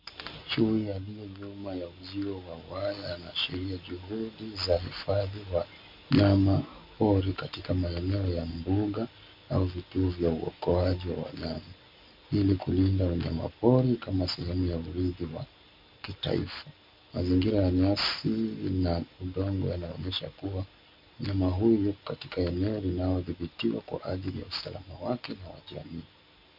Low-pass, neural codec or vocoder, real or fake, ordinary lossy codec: 5.4 kHz; none; real; MP3, 48 kbps